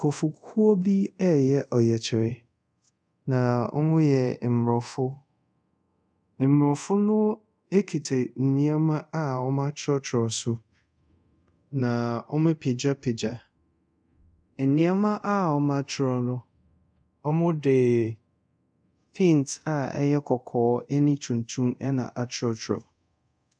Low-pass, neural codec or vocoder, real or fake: 9.9 kHz; codec, 24 kHz, 0.5 kbps, DualCodec; fake